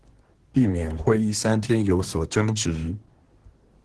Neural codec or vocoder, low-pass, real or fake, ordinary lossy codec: codec, 24 kHz, 1 kbps, SNAC; 10.8 kHz; fake; Opus, 16 kbps